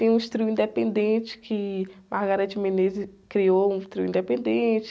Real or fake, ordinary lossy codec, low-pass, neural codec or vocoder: real; none; none; none